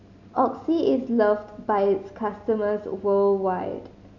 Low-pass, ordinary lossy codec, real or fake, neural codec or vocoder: 7.2 kHz; none; real; none